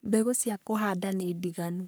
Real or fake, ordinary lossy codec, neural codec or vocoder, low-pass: fake; none; codec, 44.1 kHz, 3.4 kbps, Pupu-Codec; none